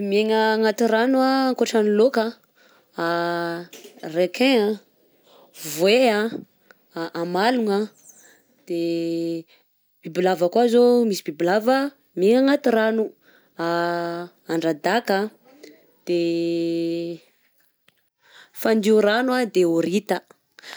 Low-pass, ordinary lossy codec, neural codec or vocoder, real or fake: none; none; none; real